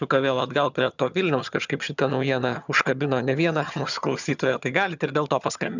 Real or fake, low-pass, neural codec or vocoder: fake; 7.2 kHz; vocoder, 22.05 kHz, 80 mel bands, HiFi-GAN